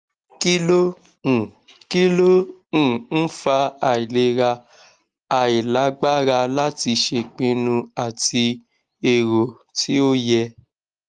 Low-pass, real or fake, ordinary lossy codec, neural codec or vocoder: 9.9 kHz; real; Opus, 24 kbps; none